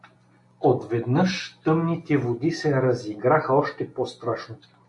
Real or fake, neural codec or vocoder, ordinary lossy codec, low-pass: real; none; AAC, 64 kbps; 10.8 kHz